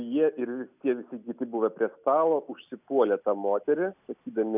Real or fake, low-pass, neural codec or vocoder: real; 3.6 kHz; none